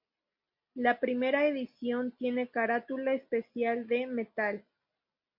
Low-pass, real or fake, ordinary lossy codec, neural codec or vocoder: 5.4 kHz; real; MP3, 48 kbps; none